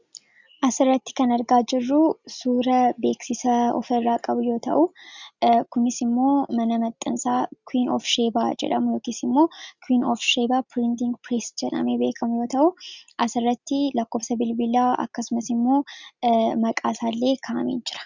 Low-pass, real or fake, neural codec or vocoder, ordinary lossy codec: 7.2 kHz; real; none; Opus, 64 kbps